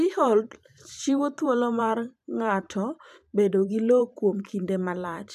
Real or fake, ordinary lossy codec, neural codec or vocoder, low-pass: fake; none; vocoder, 44.1 kHz, 128 mel bands every 512 samples, BigVGAN v2; 14.4 kHz